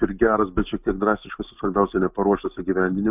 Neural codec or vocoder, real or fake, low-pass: none; real; 3.6 kHz